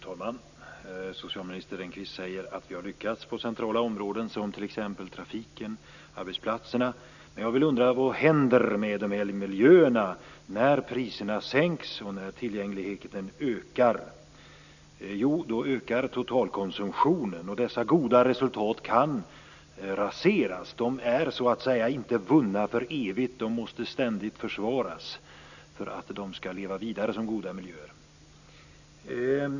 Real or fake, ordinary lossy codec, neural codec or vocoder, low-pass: real; none; none; 7.2 kHz